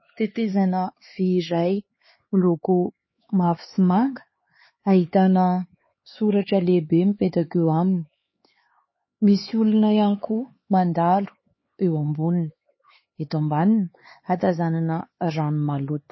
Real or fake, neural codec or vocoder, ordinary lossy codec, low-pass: fake; codec, 16 kHz, 4 kbps, X-Codec, HuBERT features, trained on LibriSpeech; MP3, 24 kbps; 7.2 kHz